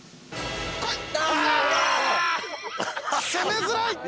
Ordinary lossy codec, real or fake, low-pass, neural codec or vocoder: none; real; none; none